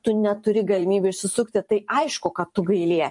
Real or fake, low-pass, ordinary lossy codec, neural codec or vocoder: fake; 10.8 kHz; MP3, 48 kbps; vocoder, 44.1 kHz, 128 mel bands every 512 samples, BigVGAN v2